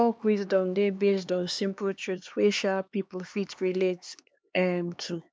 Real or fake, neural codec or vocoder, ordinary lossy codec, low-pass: fake; codec, 16 kHz, 2 kbps, X-Codec, HuBERT features, trained on LibriSpeech; none; none